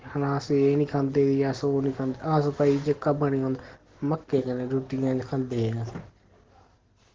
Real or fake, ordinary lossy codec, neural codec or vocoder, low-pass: real; Opus, 16 kbps; none; 7.2 kHz